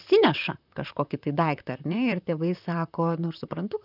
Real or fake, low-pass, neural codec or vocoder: fake; 5.4 kHz; vocoder, 44.1 kHz, 128 mel bands, Pupu-Vocoder